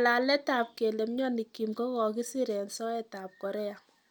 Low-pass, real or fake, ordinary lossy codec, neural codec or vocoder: none; real; none; none